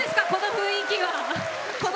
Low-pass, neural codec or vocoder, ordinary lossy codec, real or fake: none; none; none; real